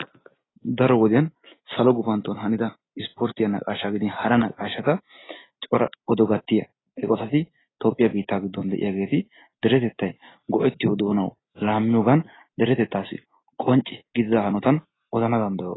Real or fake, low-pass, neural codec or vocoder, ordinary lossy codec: real; 7.2 kHz; none; AAC, 16 kbps